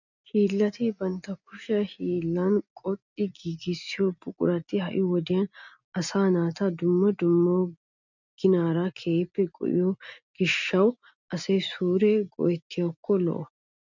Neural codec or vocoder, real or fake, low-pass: none; real; 7.2 kHz